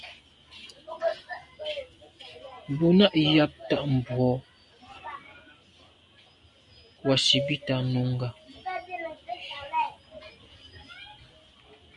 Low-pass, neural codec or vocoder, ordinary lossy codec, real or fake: 10.8 kHz; none; MP3, 96 kbps; real